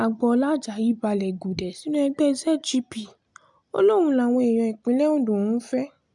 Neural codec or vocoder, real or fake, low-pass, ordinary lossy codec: none; real; 10.8 kHz; MP3, 96 kbps